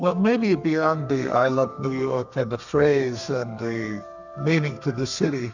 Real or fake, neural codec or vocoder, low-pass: fake; codec, 32 kHz, 1.9 kbps, SNAC; 7.2 kHz